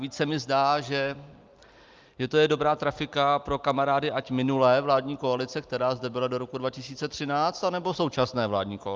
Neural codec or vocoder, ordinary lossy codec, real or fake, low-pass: none; Opus, 24 kbps; real; 7.2 kHz